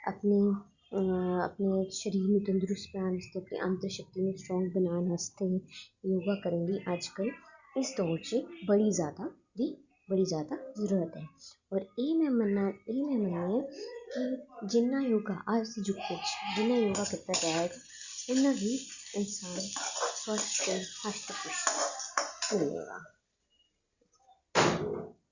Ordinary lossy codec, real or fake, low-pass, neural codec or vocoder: Opus, 64 kbps; real; 7.2 kHz; none